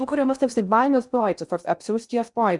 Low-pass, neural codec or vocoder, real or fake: 10.8 kHz; codec, 16 kHz in and 24 kHz out, 0.6 kbps, FocalCodec, streaming, 4096 codes; fake